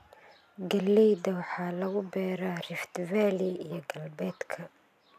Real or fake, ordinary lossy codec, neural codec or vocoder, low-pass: real; none; none; 14.4 kHz